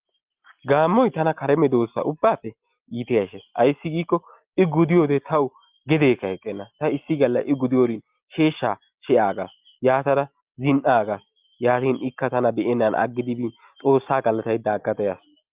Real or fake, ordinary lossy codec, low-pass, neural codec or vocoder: real; Opus, 32 kbps; 3.6 kHz; none